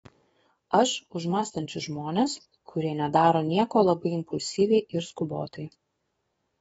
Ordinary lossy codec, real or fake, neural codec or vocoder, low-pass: AAC, 24 kbps; fake; codec, 44.1 kHz, 7.8 kbps, DAC; 19.8 kHz